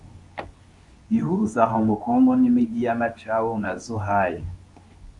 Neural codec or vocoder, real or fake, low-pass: codec, 24 kHz, 0.9 kbps, WavTokenizer, medium speech release version 2; fake; 10.8 kHz